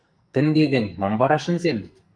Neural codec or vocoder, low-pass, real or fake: codec, 44.1 kHz, 2.6 kbps, SNAC; 9.9 kHz; fake